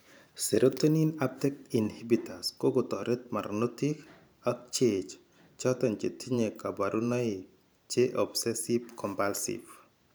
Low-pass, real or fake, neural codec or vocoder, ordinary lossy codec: none; fake; vocoder, 44.1 kHz, 128 mel bands every 512 samples, BigVGAN v2; none